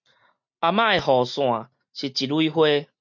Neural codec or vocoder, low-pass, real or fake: none; 7.2 kHz; real